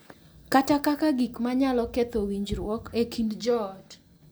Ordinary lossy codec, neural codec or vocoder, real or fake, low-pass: none; none; real; none